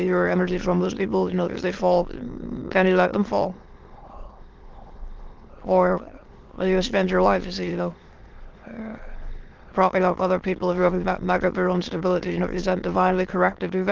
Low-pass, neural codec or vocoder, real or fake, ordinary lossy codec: 7.2 kHz; autoencoder, 22.05 kHz, a latent of 192 numbers a frame, VITS, trained on many speakers; fake; Opus, 16 kbps